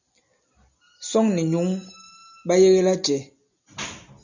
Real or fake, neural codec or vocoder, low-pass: real; none; 7.2 kHz